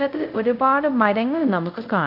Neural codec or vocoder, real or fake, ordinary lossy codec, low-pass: codec, 24 kHz, 0.5 kbps, DualCodec; fake; none; 5.4 kHz